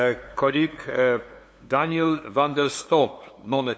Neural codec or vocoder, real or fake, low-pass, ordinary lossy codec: codec, 16 kHz, 2 kbps, FunCodec, trained on LibriTTS, 25 frames a second; fake; none; none